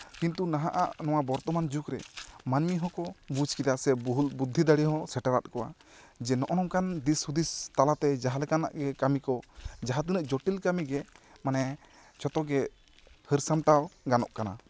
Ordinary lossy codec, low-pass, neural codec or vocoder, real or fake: none; none; none; real